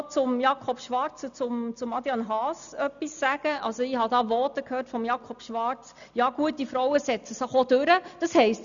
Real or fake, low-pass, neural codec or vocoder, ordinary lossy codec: real; 7.2 kHz; none; none